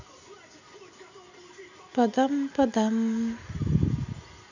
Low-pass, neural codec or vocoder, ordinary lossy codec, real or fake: 7.2 kHz; none; none; real